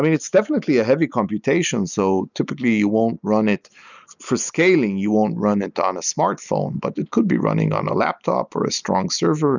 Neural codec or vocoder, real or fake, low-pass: none; real; 7.2 kHz